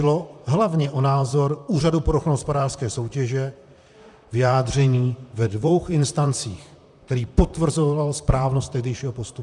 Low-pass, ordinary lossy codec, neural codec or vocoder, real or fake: 10.8 kHz; MP3, 96 kbps; vocoder, 44.1 kHz, 128 mel bands, Pupu-Vocoder; fake